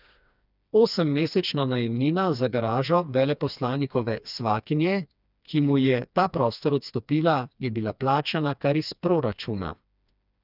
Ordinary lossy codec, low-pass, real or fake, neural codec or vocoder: none; 5.4 kHz; fake; codec, 16 kHz, 2 kbps, FreqCodec, smaller model